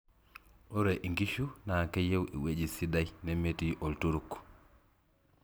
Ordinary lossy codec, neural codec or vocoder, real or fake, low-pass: none; none; real; none